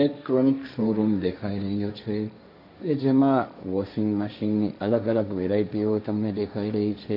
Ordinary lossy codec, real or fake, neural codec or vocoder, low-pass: none; fake; codec, 16 kHz, 1.1 kbps, Voila-Tokenizer; 5.4 kHz